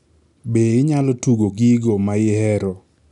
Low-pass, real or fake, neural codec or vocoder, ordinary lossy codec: 10.8 kHz; real; none; none